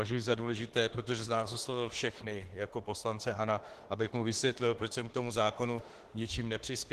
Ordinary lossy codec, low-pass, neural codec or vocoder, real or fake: Opus, 16 kbps; 14.4 kHz; autoencoder, 48 kHz, 32 numbers a frame, DAC-VAE, trained on Japanese speech; fake